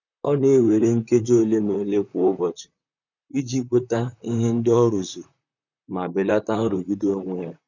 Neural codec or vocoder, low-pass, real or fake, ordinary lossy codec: vocoder, 44.1 kHz, 128 mel bands, Pupu-Vocoder; 7.2 kHz; fake; none